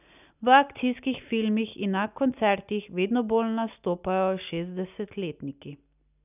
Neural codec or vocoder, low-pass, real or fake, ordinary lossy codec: none; 3.6 kHz; real; none